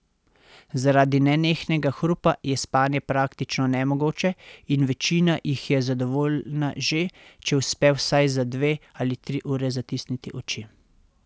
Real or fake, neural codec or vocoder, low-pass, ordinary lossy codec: real; none; none; none